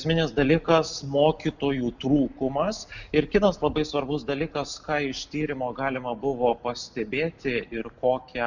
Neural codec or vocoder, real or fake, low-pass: none; real; 7.2 kHz